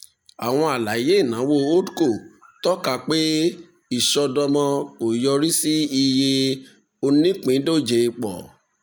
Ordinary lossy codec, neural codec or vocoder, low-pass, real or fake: none; none; none; real